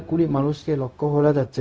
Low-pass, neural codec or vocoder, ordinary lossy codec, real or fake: none; codec, 16 kHz, 0.4 kbps, LongCat-Audio-Codec; none; fake